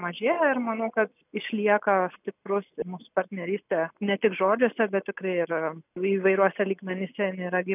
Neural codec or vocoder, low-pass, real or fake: none; 3.6 kHz; real